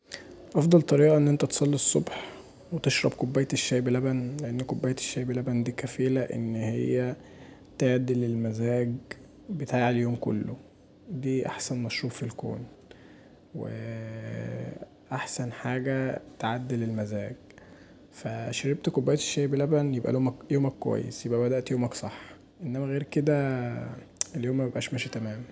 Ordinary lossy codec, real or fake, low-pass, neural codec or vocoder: none; real; none; none